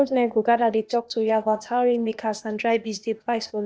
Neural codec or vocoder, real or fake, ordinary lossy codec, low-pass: codec, 16 kHz, 0.8 kbps, ZipCodec; fake; none; none